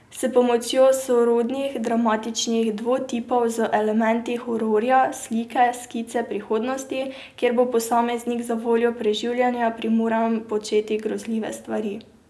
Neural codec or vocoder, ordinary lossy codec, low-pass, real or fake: none; none; none; real